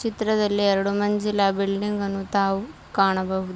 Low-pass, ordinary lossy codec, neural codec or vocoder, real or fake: none; none; none; real